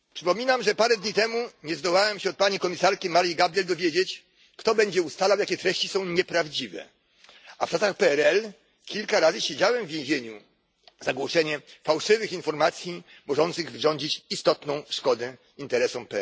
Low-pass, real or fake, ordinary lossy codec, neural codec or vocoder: none; real; none; none